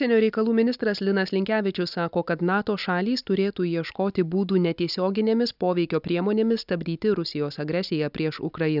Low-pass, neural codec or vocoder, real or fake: 5.4 kHz; none; real